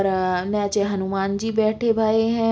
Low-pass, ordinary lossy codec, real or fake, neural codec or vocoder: none; none; real; none